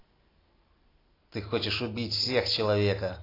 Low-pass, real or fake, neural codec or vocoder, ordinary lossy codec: 5.4 kHz; real; none; AAC, 24 kbps